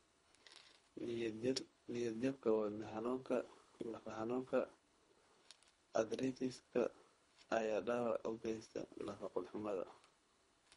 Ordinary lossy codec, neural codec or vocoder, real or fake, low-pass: MP3, 48 kbps; codec, 24 kHz, 3 kbps, HILCodec; fake; 10.8 kHz